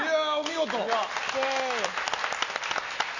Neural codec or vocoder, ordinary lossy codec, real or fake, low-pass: none; none; real; 7.2 kHz